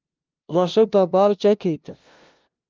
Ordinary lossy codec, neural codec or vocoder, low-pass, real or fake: Opus, 32 kbps; codec, 16 kHz, 0.5 kbps, FunCodec, trained on LibriTTS, 25 frames a second; 7.2 kHz; fake